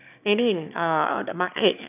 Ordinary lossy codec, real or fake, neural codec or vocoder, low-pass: none; fake; autoencoder, 22.05 kHz, a latent of 192 numbers a frame, VITS, trained on one speaker; 3.6 kHz